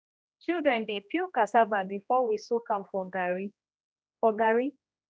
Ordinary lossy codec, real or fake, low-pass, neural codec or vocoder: none; fake; none; codec, 16 kHz, 1 kbps, X-Codec, HuBERT features, trained on general audio